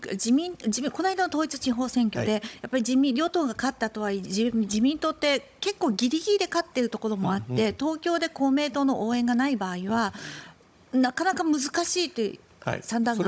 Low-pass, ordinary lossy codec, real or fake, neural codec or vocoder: none; none; fake; codec, 16 kHz, 16 kbps, FunCodec, trained on Chinese and English, 50 frames a second